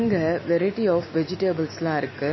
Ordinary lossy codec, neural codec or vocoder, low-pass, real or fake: MP3, 24 kbps; none; 7.2 kHz; real